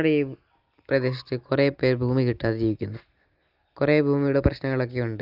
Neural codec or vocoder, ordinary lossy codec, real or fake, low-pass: none; Opus, 32 kbps; real; 5.4 kHz